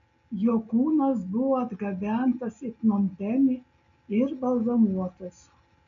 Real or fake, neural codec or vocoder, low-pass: real; none; 7.2 kHz